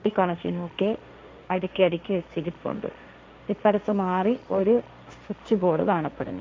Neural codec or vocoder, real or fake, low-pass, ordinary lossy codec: codec, 16 kHz, 1.1 kbps, Voila-Tokenizer; fake; none; none